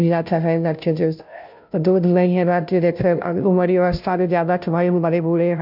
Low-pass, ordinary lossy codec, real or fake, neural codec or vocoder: 5.4 kHz; none; fake; codec, 16 kHz, 0.5 kbps, FunCodec, trained on Chinese and English, 25 frames a second